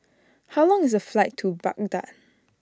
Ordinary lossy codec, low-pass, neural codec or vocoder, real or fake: none; none; none; real